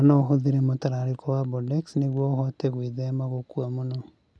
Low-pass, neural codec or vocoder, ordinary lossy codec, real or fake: none; none; none; real